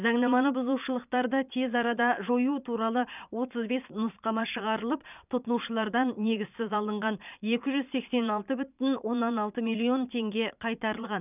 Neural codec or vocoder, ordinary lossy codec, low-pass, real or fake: vocoder, 22.05 kHz, 80 mel bands, Vocos; none; 3.6 kHz; fake